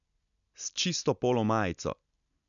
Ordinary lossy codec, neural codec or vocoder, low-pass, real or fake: none; none; 7.2 kHz; real